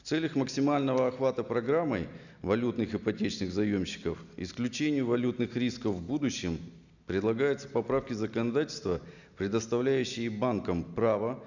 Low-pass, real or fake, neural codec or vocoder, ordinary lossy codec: 7.2 kHz; real; none; none